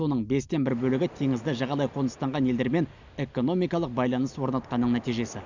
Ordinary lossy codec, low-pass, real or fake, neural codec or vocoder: none; 7.2 kHz; real; none